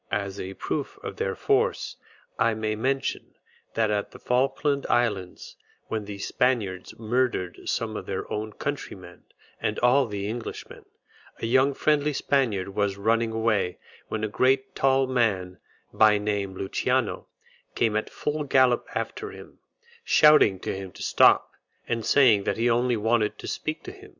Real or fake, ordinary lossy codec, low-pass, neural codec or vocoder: real; Opus, 64 kbps; 7.2 kHz; none